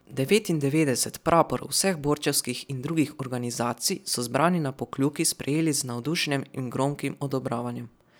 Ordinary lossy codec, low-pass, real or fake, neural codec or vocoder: none; none; real; none